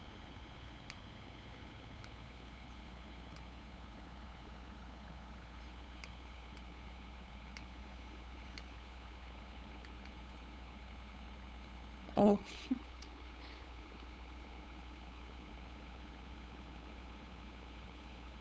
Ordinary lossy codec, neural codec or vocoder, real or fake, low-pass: none; codec, 16 kHz, 8 kbps, FunCodec, trained on LibriTTS, 25 frames a second; fake; none